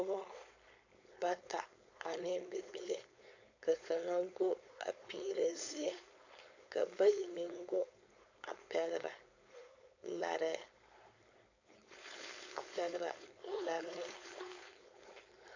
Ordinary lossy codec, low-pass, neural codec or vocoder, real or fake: AAC, 48 kbps; 7.2 kHz; codec, 16 kHz, 4.8 kbps, FACodec; fake